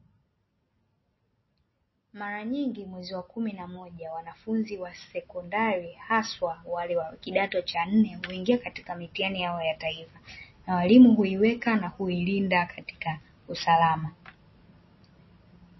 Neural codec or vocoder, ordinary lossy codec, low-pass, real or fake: none; MP3, 24 kbps; 7.2 kHz; real